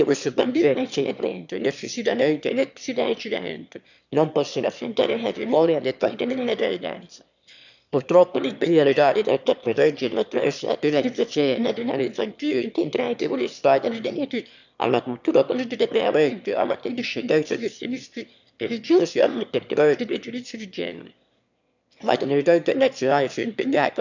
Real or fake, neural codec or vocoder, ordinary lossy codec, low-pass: fake; autoencoder, 22.05 kHz, a latent of 192 numbers a frame, VITS, trained on one speaker; none; 7.2 kHz